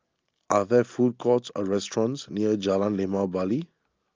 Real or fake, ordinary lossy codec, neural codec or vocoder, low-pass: real; Opus, 32 kbps; none; 7.2 kHz